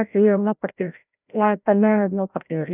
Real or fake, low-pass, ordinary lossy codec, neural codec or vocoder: fake; 3.6 kHz; none; codec, 16 kHz, 0.5 kbps, FreqCodec, larger model